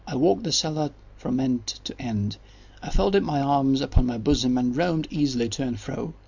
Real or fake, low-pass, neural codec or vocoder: real; 7.2 kHz; none